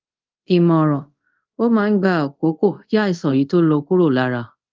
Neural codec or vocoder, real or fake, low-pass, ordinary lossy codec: codec, 24 kHz, 0.5 kbps, DualCodec; fake; 7.2 kHz; Opus, 32 kbps